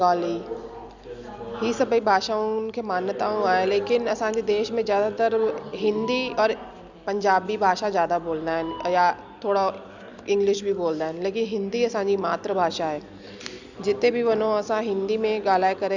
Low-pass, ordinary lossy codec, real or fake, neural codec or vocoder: 7.2 kHz; Opus, 64 kbps; real; none